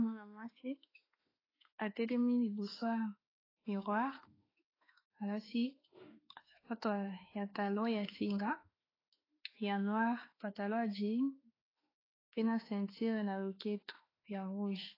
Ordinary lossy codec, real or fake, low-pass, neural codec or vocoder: AAC, 24 kbps; fake; 5.4 kHz; autoencoder, 48 kHz, 32 numbers a frame, DAC-VAE, trained on Japanese speech